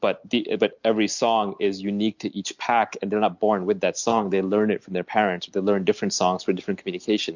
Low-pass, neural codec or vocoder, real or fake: 7.2 kHz; none; real